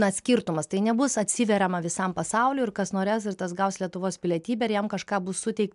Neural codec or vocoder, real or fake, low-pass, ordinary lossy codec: none; real; 10.8 kHz; MP3, 96 kbps